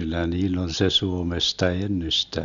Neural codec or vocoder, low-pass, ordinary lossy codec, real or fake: none; 7.2 kHz; none; real